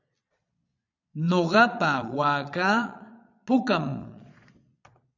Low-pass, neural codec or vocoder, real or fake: 7.2 kHz; vocoder, 22.05 kHz, 80 mel bands, Vocos; fake